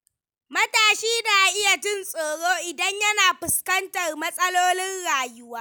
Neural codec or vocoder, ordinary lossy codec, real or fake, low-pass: none; none; real; none